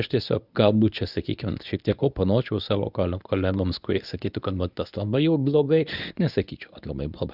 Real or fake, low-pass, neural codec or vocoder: fake; 5.4 kHz; codec, 24 kHz, 0.9 kbps, WavTokenizer, medium speech release version 1